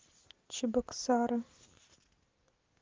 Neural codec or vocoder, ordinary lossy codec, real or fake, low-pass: none; Opus, 32 kbps; real; 7.2 kHz